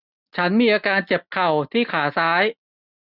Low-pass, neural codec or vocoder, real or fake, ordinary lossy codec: 5.4 kHz; none; real; none